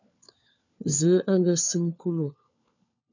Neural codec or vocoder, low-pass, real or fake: codec, 16 kHz, 4 kbps, FunCodec, trained on LibriTTS, 50 frames a second; 7.2 kHz; fake